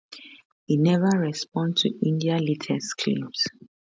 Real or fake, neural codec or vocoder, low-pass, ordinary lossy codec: real; none; none; none